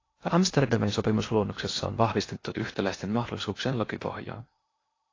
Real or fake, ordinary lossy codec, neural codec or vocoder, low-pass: fake; AAC, 32 kbps; codec, 16 kHz in and 24 kHz out, 0.8 kbps, FocalCodec, streaming, 65536 codes; 7.2 kHz